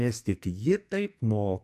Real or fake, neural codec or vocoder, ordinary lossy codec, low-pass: fake; codec, 44.1 kHz, 2.6 kbps, SNAC; MP3, 96 kbps; 14.4 kHz